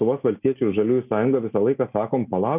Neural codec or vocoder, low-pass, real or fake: none; 3.6 kHz; real